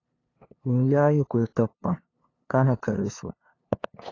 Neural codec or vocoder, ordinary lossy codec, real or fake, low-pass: codec, 16 kHz, 2 kbps, FunCodec, trained on LibriTTS, 25 frames a second; AAC, 32 kbps; fake; 7.2 kHz